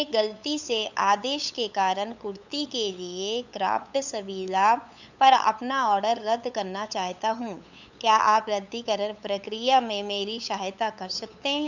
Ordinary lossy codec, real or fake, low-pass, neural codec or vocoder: none; fake; 7.2 kHz; codec, 16 kHz, 8 kbps, FunCodec, trained on LibriTTS, 25 frames a second